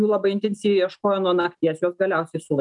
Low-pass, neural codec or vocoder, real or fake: 10.8 kHz; none; real